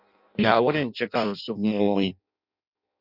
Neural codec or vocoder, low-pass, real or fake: codec, 16 kHz in and 24 kHz out, 0.6 kbps, FireRedTTS-2 codec; 5.4 kHz; fake